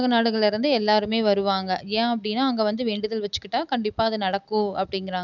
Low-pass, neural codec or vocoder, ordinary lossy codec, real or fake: 7.2 kHz; none; none; real